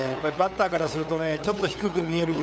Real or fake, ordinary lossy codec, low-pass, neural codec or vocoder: fake; none; none; codec, 16 kHz, 8 kbps, FunCodec, trained on LibriTTS, 25 frames a second